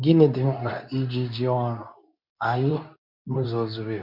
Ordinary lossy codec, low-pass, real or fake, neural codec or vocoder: MP3, 48 kbps; 5.4 kHz; fake; codec, 24 kHz, 0.9 kbps, WavTokenizer, medium speech release version 2